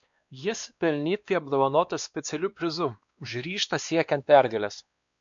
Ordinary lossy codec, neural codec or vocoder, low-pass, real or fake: MP3, 64 kbps; codec, 16 kHz, 2 kbps, X-Codec, WavLM features, trained on Multilingual LibriSpeech; 7.2 kHz; fake